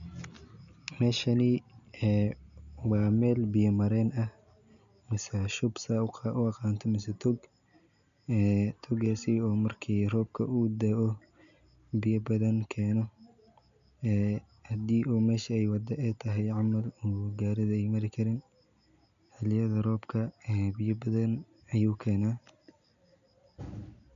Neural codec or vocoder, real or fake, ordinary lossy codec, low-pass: none; real; MP3, 96 kbps; 7.2 kHz